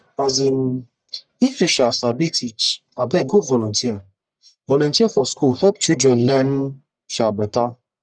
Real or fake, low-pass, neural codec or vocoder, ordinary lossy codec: fake; 9.9 kHz; codec, 44.1 kHz, 1.7 kbps, Pupu-Codec; none